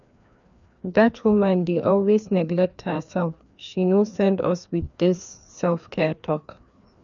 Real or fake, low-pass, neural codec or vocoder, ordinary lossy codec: fake; 7.2 kHz; codec, 16 kHz, 2 kbps, FreqCodec, larger model; none